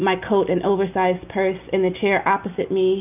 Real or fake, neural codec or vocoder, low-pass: real; none; 3.6 kHz